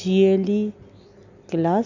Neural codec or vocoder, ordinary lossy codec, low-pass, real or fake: none; none; 7.2 kHz; real